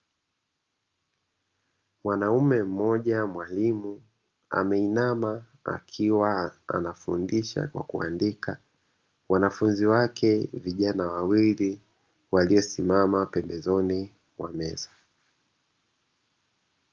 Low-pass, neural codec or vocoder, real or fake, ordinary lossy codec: 7.2 kHz; none; real; Opus, 24 kbps